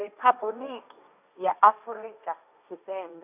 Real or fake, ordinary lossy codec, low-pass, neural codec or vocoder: fake; none; 3.6 kHz; codec, 16 kHz, 1.1 kbps, Voila-Tokenizer